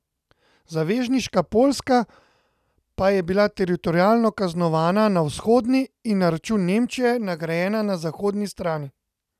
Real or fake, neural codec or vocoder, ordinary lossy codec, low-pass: real; none; none; 14.4 kHz